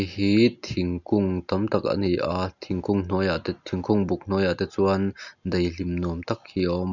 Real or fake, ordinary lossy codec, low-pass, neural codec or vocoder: real; none; 7.2 kHz; none